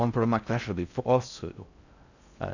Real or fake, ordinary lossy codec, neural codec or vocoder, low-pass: fake; none; codec, 16 kHz in and 24 kHz out, 0.6 kbps, FocalCodec, streaming, 4096 codes; 7.2 kHz